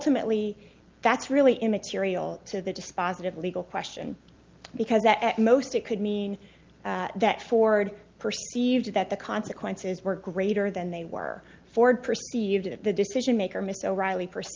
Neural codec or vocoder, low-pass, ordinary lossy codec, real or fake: none; 7.2 kHz; Opus, 32 kbps; real